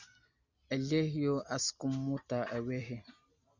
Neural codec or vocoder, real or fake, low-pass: none; real; 7.2 kHz